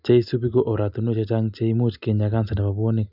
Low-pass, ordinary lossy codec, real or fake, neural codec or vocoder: 5.4 kHz; none; real; none